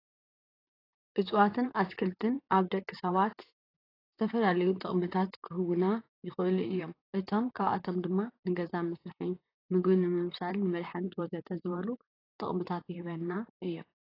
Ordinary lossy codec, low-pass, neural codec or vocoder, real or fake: AAC, 24 kbps; 5.4 kHz; vocoder, 44.1 kHz, 128 mel bands, Pupu-Vocoder; fake